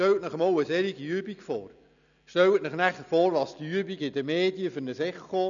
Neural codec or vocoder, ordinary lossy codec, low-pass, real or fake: none; none; 7.2 kHz; real